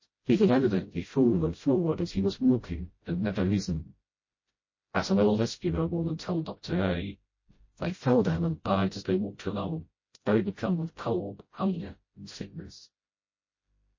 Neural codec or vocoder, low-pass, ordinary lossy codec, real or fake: codec, 16 kHz, 0.5 kbps, FreqCodec, smaller model; 7.2 kHz; MP3, 32 kbps; fake